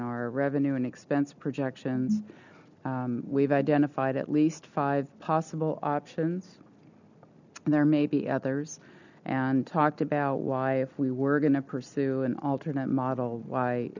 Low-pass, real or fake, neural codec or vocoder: 7.2 kHz; real; none